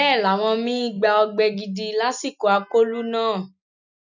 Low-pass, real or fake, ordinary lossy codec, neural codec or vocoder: 7.2 kHz; real; none; none